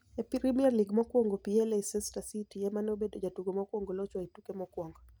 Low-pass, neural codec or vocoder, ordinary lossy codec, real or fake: none; none; none; real